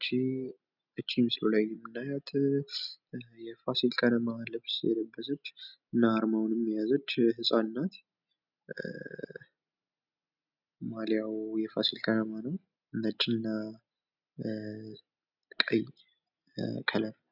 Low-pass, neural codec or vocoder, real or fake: 5.4 kHz; none; real